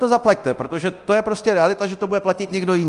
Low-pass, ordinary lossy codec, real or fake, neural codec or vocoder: 10.8 kHz; Opus, 32 kbps; fake; codec, 24 kHz, 0.9 kbps, DualCodec